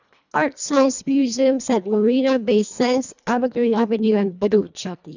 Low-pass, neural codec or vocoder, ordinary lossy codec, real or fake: 7.2 kHz; codec, 24 kHz, 1.5 kbps, HILCodec; none; fake